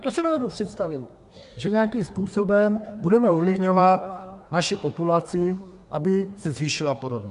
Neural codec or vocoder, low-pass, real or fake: codec, 24 kHz, 1 kbps, SNAC; 10.8 kHz; fake